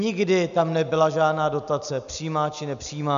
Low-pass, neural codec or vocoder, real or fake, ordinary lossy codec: 7.2 kHz; none; real; AAC, 96 kbps